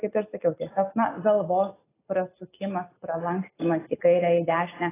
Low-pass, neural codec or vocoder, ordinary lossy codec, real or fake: 3.6 kHz; none; AAC, 16 kbps; real